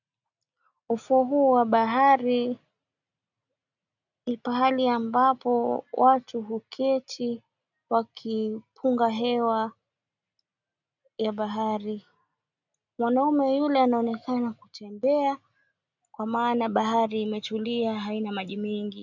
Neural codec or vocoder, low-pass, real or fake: none; 7.2 kHz; real